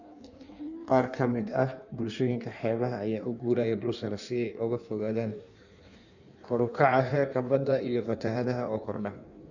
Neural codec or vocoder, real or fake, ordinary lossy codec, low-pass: codec, 16 kHz in and 24 kHz out, 1.1 kbps, FireRedTTS-2 codec; fake; none; 7.2 kHz